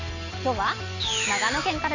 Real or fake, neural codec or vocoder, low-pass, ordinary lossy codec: real; none; 7.2 kHz; none